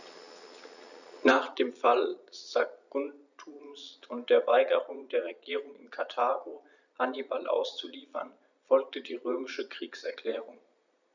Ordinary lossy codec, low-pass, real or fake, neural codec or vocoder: none; 7.2 kHz; fake; vocoder, 22.05 kHz, 80 mel bands, Vocos